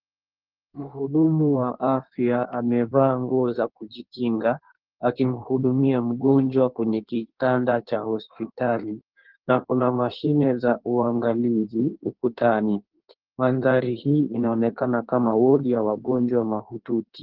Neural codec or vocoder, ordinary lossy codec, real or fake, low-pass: codec, 16 kHz in and 24 kHz out, 1.1 kbps, FireRedTTS-2 codec; Opus, 16 kbps; fake; 5.4 kHz